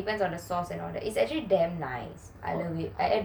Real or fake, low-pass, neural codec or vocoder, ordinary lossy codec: real; none; none; none